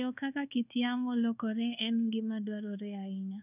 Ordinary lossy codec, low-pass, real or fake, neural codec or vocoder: none; 3.6 kHz; fake; codec, 24 kHz, 1.2 kbps, DualCodec